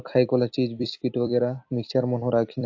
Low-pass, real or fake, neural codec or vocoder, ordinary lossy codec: 7.2 kHz; fake; vocoder, 44.1 kHz, 128 mel bands every 256 samples, BigVGAN v2; AAC, 48 kbps